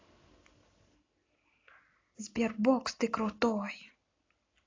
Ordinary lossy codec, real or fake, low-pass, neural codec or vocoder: AAC, 32 kbps; real; 7.2 kHz; none